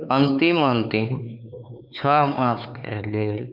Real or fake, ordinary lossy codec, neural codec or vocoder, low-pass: fake; none; codec, 16 kHz, 4 kbps, X-Codec, HuBERT features, trained on LibriSpeech; 5.4 kHz